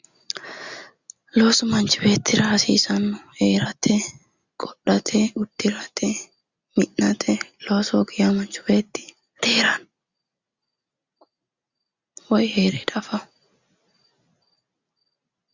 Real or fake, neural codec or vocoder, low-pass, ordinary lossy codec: real; none; 7.2 kHz; Opus, 64 kbps